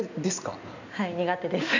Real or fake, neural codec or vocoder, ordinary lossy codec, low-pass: real; none; none; 7.2 kHz